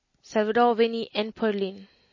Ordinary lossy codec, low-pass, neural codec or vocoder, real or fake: MP3, 32 kbps; 7.2 kHz; none; real